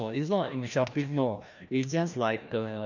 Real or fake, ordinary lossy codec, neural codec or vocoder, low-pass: fake; none; codec, 16 kHz, 1 kbps, FreqCodec, larger model; 7.2 kHz